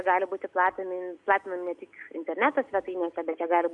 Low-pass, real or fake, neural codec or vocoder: 10.8 kHz; real; none